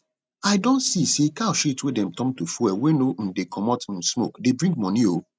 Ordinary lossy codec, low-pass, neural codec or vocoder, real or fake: none; none; none; real